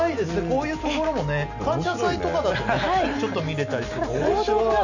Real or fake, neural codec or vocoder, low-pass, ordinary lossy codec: real; none; 7.2 kHz; none